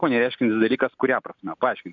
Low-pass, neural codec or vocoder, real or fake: 7.2 kHz; none; real